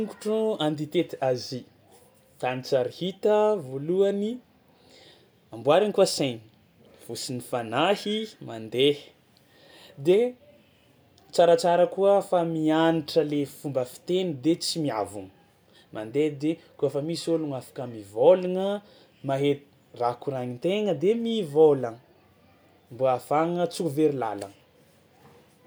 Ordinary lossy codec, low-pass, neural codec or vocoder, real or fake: none; none; none; real